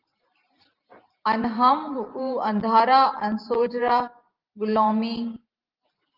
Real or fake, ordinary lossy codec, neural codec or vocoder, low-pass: fake; Opus, 24 kbps; vocoder, 44.1 kHz, 128 mel bands every 512 samples, BigVGAN v2; 5.4 kHz